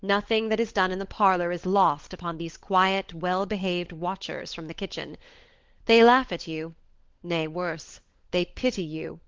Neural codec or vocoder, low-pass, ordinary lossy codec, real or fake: codec, 16 kHz, 16 kbps, FunCodec, trained on LibriTTS, 50 frames a second; 7.2 kHz; Opus, 16 kbps; fake